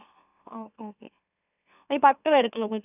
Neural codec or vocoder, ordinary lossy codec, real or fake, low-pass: autoencoder, 44.1 kHz, a latent of 192 numbers a frame, MeloTTS; none; fake; 3.6 kHz